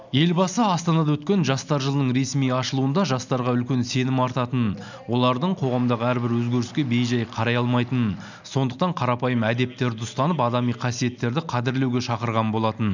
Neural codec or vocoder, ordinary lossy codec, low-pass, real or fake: none; none; 7.2 kHz; real